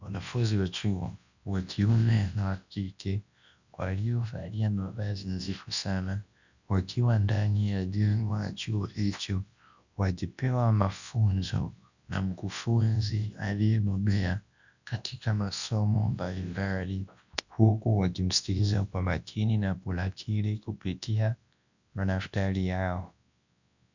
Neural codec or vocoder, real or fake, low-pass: codec, 24 kHz, 0.9 kbps, WavTokenizer, large speech release; fake; 7.2 kHz